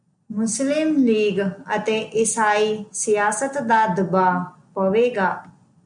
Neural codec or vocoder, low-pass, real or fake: none; 9.9 kHz; real